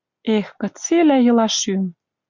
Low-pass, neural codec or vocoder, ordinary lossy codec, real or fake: 7.2 kHz; none; MP3, 64 kbps; real